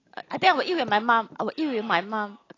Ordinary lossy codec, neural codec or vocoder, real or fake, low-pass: AAC, 32 kbps; none; real; 7.2 kHz